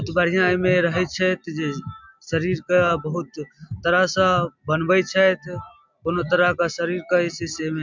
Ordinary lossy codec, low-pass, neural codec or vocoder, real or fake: MP3, 64 kbps; 7.2 kHz; none; real